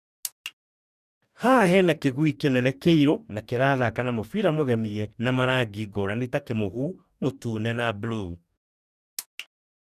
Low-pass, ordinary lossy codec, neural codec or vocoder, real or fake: 14.4 kHz; none; codec, 44.1 kHz, 2.6 kbps, DAC; fake